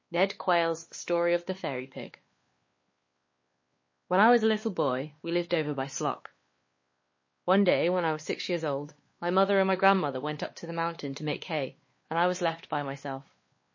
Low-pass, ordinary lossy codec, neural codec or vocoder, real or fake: 7.2 kHz; MP3, 32 kbps; codec, 16 kHz, 2 kbps, X-Codec, WavLM features, trained on Multilingual LibriSpeech; fake